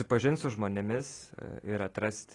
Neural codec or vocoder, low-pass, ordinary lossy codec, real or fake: none; 10.8 kHz; AAC, 32 kbps; real